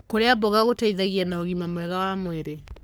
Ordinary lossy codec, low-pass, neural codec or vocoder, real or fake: none; none; codec, 44.1 kHz, 3.4 kbps, Pupu-Codec; fake